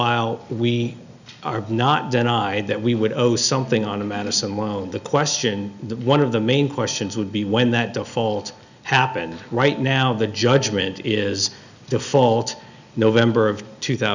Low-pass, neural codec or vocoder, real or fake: 7.2 kHz; none; real